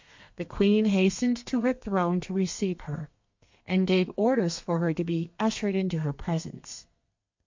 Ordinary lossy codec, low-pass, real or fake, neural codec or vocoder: MP3, 48 kbps; 7.2 kHz; fake; codec, 32 kHz, 1.9 kbps, SNAC